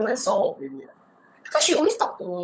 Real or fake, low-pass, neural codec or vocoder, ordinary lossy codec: fake; none; codec, 16 kHz, 16 kbps, FunCodec, trained on LibriTTS, 50 frames a second; none